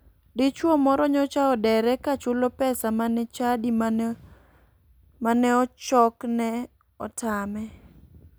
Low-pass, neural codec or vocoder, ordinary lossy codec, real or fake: none; none; none; real